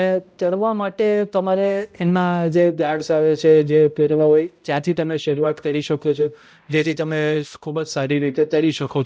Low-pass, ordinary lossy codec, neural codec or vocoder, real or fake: none; none; codec, 16 kHz, 0.5 kbps, X-Codec, HuBERT features, trained on balanced general audio; fake